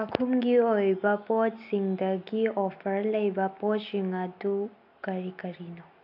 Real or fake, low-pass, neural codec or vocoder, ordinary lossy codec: real; 5.4 kHz; none; AAC, 48 kbps